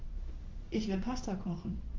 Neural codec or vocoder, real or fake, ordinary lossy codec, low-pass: codec, 16 kHz in and 24 kHz out, 1 kbps, XY-Tokenizer; fake; Opus, 32 kbps; 7.2 kHz